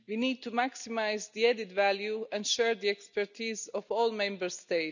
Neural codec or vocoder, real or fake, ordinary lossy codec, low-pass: none; real; none; 7.2 kHz